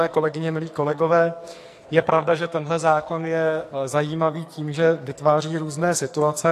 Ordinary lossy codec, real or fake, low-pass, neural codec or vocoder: AAC, 64 kbps; fake; 14.4 kHz; codec, 44.1 kHz, 2.6 kbps, SNAC